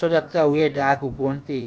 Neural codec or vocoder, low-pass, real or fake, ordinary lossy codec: codec, 16 kHz, about 1 kbps, DyCAST, with the encoder's durations; none; fake; none